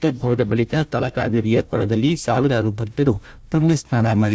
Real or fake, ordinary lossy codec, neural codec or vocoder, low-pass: fake; none; codec, 16 kHz, 1 kbps, FunCodec, trained on Chinese and English, 50 frames a second; none